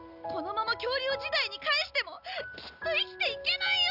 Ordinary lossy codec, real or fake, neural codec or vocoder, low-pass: none; real; none; 5.4 kHz